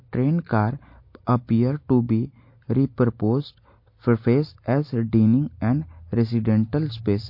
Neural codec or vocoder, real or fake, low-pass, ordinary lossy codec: none; real; 5.4 kHz; MP3, 32 kbps